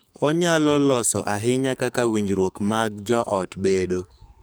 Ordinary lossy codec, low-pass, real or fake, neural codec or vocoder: none; none; fake; codec, 44.1 kHz, 2.6 kbps, SNAC